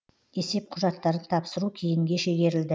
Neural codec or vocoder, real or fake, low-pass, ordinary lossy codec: none; real; none; none